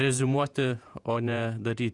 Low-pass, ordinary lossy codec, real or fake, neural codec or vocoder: 10.8 kHz; Opus, 64 kbps; fake; vocoder, 48 kHz, 128 mel bands, Vocos